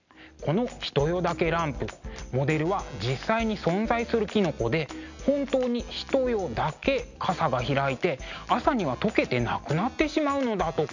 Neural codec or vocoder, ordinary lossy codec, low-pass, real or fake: none; none; 7.2 kHz; real